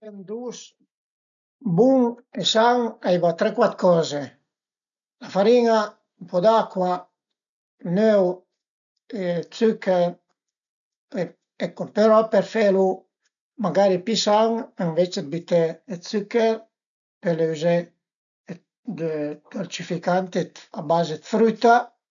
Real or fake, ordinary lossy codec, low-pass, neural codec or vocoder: real; none; 7.2 kHz; none